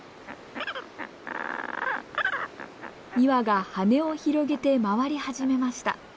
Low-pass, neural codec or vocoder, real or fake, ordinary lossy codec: none; none; real; none